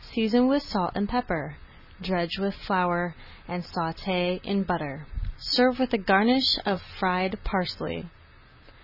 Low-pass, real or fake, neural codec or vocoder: 5.4 kHz; real; none